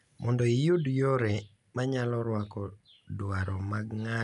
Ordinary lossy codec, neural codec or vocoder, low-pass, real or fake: none; none; 10.8 kHz; real